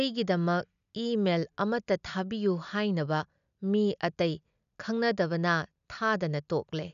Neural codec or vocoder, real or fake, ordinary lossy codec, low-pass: none; real; none; 7.2 kHz